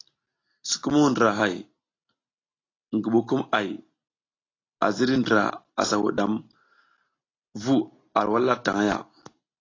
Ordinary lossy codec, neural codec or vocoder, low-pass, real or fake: AAC, 32 kbps; none; 7.2 kHz; real